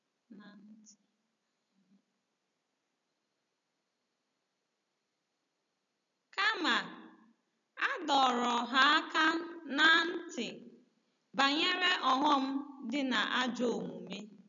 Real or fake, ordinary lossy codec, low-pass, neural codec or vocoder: real; none; 7.2 kHz; none